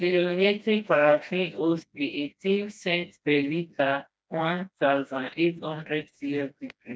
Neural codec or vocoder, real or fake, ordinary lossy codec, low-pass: codec, 16 kHz, 1 kbps, FreqCodec, smaller model; fake; none; none